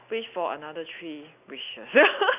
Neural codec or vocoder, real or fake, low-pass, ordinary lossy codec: none; real; 3.6 kHz; none